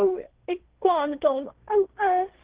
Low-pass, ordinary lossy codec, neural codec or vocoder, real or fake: 3.6 kHz; Opus, 16 kbps; codec, 16 kHz, 1.1 kbps, Voila-Tokenizer; fake